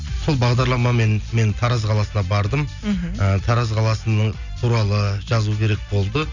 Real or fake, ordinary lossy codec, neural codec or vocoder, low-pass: real; none; none; 7.2 kHz